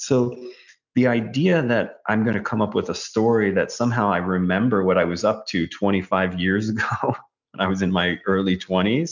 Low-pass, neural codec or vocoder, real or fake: 7.2 kHz; none; real